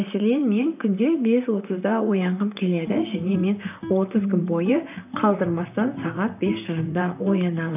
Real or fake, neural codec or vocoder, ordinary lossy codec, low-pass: fake; vocoder, 44.1 kHz, 128 mel bands, Pupu-Vocoder; none; 3.6 kHz